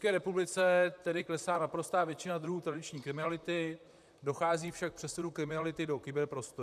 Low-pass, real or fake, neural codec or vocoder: 14.4 kHz; fake; vocoder, 44.1 kHz, 128 mel bands, Pupu-Vocoder